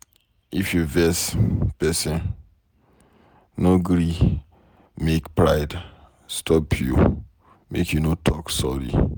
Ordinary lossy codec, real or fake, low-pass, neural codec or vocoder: none; real; none; none